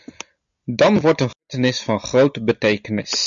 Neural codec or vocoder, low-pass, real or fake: none; 7.2 kHz; real